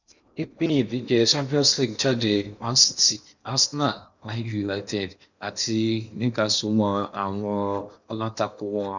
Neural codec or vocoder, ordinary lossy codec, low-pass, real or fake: codec, 16 kHz in and 24 kHz out, 0.8 kbps, FocalCodec, streaming, 65536 codes; none; 7.2 kHz; fake